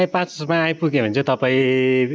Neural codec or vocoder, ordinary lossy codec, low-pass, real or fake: none; none; none; real